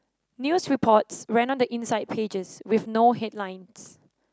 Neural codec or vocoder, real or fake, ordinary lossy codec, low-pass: none; real; none; none